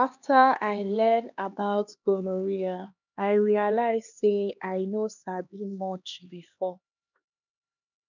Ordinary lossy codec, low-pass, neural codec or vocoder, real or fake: none; 7.2 kHz; codec, 16 kHz, 2 kbps, X-Codec, HuBERT features, trained on LibriSpeech; fake